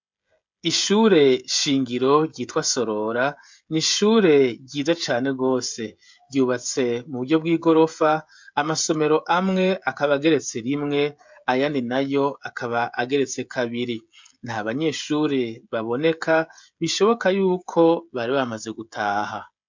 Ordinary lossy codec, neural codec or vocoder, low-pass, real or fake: MP3, 64 kbps; codec, 16 kHz, 16 kbps, FreqCodec, smaller model; 7.2 kHz; fake